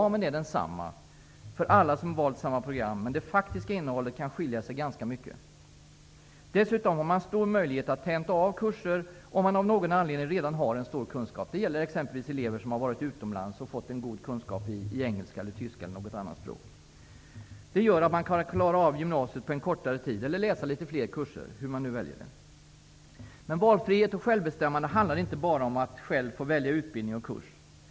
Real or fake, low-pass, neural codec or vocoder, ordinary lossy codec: real; none; none; none